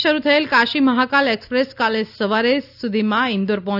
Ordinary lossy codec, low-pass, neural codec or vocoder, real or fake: none; 5.4 kHz; none; real